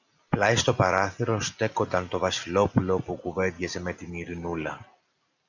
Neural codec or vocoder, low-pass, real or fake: none; 7.2 kHz; real